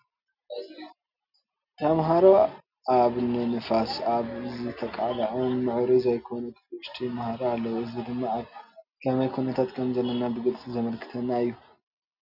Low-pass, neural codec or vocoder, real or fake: 5.4 kHz; none; real